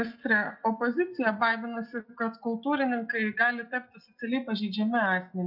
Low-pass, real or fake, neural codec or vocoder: 5.4 kHz; real; none